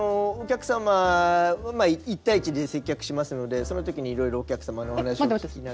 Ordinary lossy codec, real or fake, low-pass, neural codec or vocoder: none; real; none; none